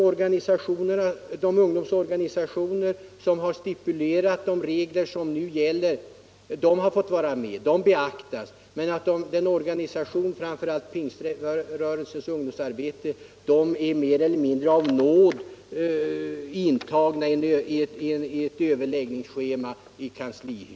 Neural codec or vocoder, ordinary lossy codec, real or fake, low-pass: none; none; real; none